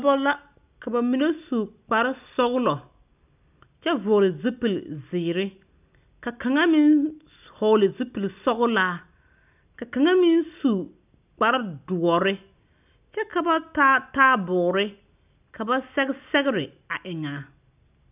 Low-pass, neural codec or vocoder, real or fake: 3.6 kHz; none; real